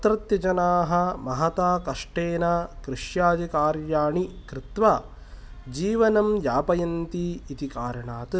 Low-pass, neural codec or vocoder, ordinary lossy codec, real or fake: none; none; none; real